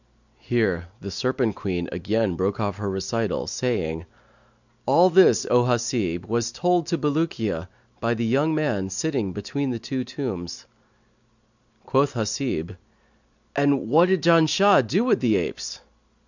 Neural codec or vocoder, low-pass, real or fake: none; 7.2 kHz; real